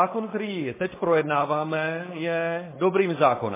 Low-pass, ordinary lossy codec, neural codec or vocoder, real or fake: 3.6 kHz; MP3, 16 kbps; codec, 16 kHz, 4.8 kbps, FACodec; fake